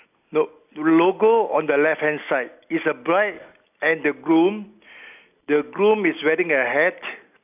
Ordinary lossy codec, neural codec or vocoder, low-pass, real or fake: none; none; 3.6 kHz; real